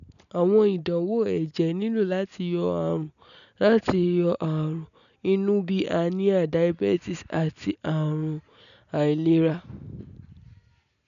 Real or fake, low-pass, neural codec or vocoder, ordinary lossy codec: real; 7.2 kHz; none; none